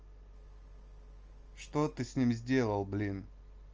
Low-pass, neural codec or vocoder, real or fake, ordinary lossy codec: 7.2 kHz; none; real; Opus, 24 kbps